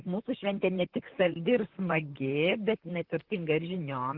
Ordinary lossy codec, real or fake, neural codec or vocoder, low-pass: Opus, 16 kbps; fake; vocoder, 44.1 kHz, 128 mel bands, Pupu-Vocoder; 5.4 kHz